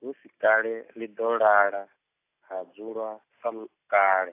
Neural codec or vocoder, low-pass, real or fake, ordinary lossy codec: none; 3.6 kHz; real; AAC, 24 kbps